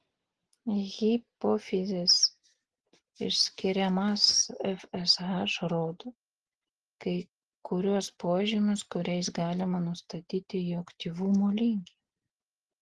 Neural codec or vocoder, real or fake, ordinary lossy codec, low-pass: none; real; Opus, 16 kbps; 10.8 kHz